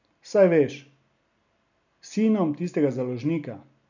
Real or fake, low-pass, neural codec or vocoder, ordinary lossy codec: real; 7.2 kHz; none; none